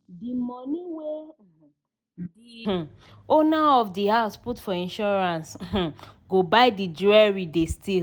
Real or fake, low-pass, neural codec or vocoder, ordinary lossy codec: real; none; none; none